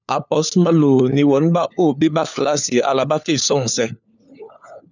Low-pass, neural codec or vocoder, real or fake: 7.2 kHz; codec, 16 kHz, 4 kbps, FunCodec, trained on LibriTTS, 50 frames a second; fake